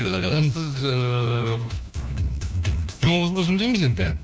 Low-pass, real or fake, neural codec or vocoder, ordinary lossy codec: none; fake; codec, 16 kHz, 1 kbps, FunCodec, trained on LibriTTS, 50 frames a second; none